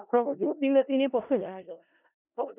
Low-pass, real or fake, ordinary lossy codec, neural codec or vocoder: 3.6 kHz; fake; none; codec, 16 kHz in and 24 kHz out, 0.4 kbps, LongCat-Audio-Codec, four codebook decoder